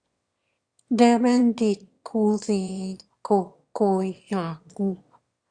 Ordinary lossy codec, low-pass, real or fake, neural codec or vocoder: Opus, 64 kbps; 9.9 kHz; fake; autoencoder, 22.05 kHz, a latent of 192 numbers a frame, VITS, trained on one speaker